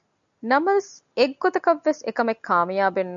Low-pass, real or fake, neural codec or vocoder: 7.2 kHz; real; none